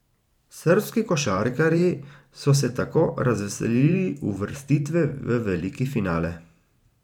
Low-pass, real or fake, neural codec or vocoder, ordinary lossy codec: 19.8 kHz; fake; vocoder, 44.1 kHz, 128 mel bands every 512 samples, BigVGAN v2; none